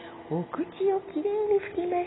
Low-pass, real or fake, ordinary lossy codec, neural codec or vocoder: 7.2 kHz; real; AAC, 16 kbps; none